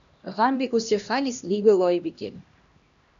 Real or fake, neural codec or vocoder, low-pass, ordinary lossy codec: fake; codec, 16 kHz, 1 kbps, X-Codec, HuBERT features, trained on LibriSpeech; 7.2 kHz; MP3, 96 kbps